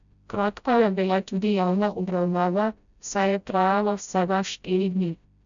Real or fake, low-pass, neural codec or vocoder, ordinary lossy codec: fake; 7.2 kHz; codec, 16 kHz, 0.5 kbps, FreqCodec, smaller model; none